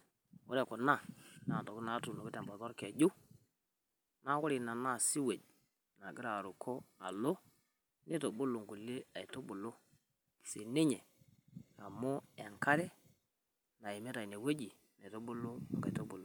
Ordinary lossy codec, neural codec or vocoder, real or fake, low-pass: none; none; real; none